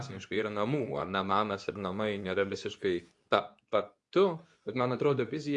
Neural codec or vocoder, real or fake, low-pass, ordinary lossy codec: codec, 24 kHz, 0.9 kbps, WavTokenizer, medium speech release version 2; fake; 10.8 kHz; AAC, 64 kbps